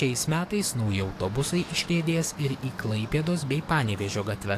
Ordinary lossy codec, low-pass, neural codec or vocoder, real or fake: AAC, 64 kbps; 14.4 kHz; codec, 44.1 kHz, 7.8 kbps, DAC; fake